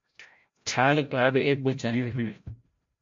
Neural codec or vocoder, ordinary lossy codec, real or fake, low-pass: codec, 16 kHz, 0.5 kbps, FreqCodec, larger model; MP3, 48 kbps; fake; 7.2 kHz